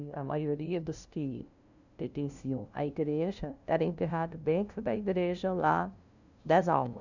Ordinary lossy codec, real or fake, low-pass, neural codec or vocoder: none; fake; 7.2 kHz; codec, 16 kHz, 0.5 kbps, FunCodec, trained on LibriTTS, 25 frames a second